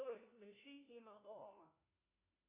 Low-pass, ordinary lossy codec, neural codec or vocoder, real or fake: 3.6 kHz; MP3, 32 kbps; codec, 16 kHz, 1 kbps, FunCodec, trained on LibriTTS, 50 frames a second; fake